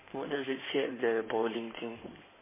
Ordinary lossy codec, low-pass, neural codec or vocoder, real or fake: MP3, 16 kbps; 3.6 kHz; codec, 16 kHz in and 24 kHz out, 2.2 kbps, FireRedTTS-2 codec; fake